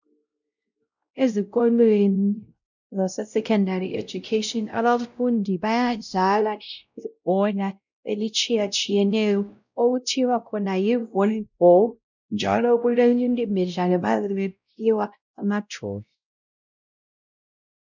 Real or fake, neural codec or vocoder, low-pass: fake; codec, 16 kHz, 0.5 kbps, X-Codec, WavLM features, trained on Multilingual LibriSpeech; 7.2 kHz